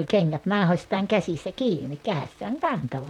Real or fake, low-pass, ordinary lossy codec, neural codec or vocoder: fake; 19.8 kHz; none; vocoder, 44.1 kHz, 128 mel bands, Pupu-Vocoder